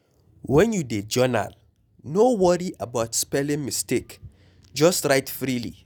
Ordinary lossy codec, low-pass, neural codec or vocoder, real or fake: none; none; none; real